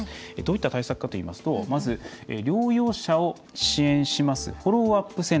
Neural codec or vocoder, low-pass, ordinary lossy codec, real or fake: none; none; none; real